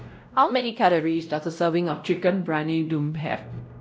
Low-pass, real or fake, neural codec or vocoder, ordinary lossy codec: none; fake; codec, 16 kHz, 0.5 kbps, X-Codec, WavLM features, trained on Multilingual LibriSpeech; none